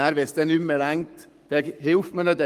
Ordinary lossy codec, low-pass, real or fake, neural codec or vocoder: Opus, 32 kbps; 14.4 kHz; fake; codec, 44.1 kHz, 7.8 kbps, Pupu-Codec